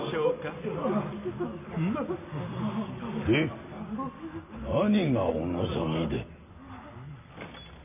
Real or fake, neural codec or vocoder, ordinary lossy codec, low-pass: real; none; none; 3.6 kHz